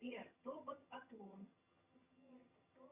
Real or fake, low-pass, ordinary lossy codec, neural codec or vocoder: fake; 3.6 kHz; Opus, 64 kbps; vocoder, 22.05 kHz, 80 mel bands, HiFi-GAN